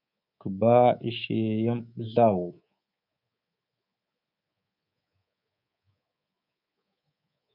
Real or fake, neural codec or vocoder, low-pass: fake; codec, 24 kHz, 3.1 kbps, DualCodec; 5.4 kHz